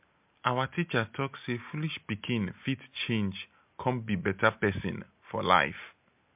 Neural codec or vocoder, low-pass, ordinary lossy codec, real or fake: none; 3.6 kHz; MP3, 32 kbps; real